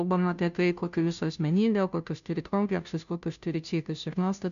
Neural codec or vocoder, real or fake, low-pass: codec, 16 kHz, 0.5 kbps, FunCodec, trained on Chinese and English, 25 frames a second; fake; 7.2 kHz